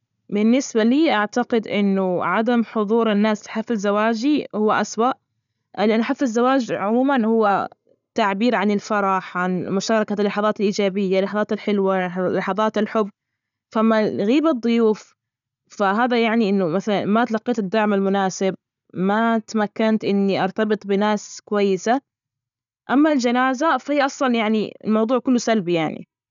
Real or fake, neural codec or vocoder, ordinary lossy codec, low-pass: real; none; none; 7.2 kHz